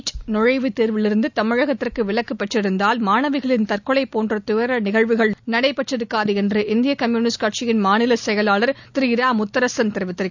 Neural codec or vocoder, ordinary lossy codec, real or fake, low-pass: none; none; real; 7.2 kHz